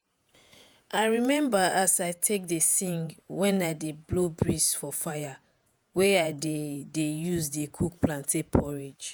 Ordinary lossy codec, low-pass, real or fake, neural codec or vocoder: none; none; fake; vocoder, 48 kHz, 128 mel bands, Vocos